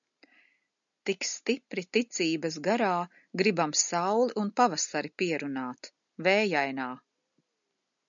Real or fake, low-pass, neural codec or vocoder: real; 7.2 kHz; none